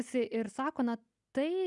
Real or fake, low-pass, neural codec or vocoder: real; 10.8 kHz; none